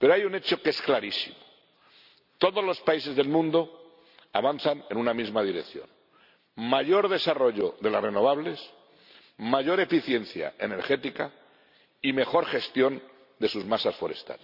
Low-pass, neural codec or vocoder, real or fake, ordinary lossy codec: 5.4 kHz; none; real; none